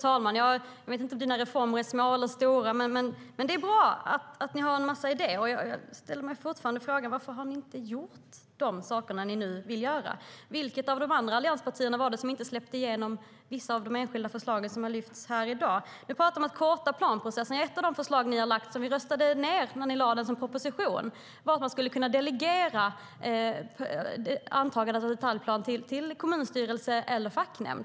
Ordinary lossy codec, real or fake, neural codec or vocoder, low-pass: none; real; none; none